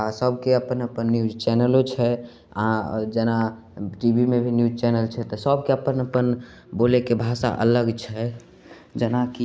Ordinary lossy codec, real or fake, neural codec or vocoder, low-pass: none; real; none; none